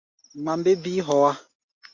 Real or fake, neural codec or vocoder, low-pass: real; none; 7.2 kHz